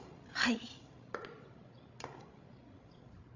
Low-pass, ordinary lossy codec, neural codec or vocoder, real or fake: 7.2 kHz; AAC, 48 kbps; codec, 16 kHz, 16 kbps, FreqCodec, larger model; fake